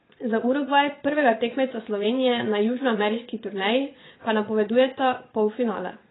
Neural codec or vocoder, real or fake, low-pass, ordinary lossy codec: vocoder, 22.05 kHz, 80 mel bands, Vocos; fake; 7.2 kHz; AAC, 16 kbps